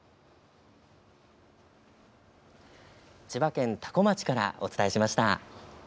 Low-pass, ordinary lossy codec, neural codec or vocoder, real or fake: none; none; none; real